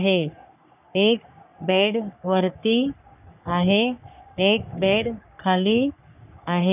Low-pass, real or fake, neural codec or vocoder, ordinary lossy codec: 3.6 kHz; fake; codec, 44.1 kHz, 3.4 kbps, Pupu-Codec; none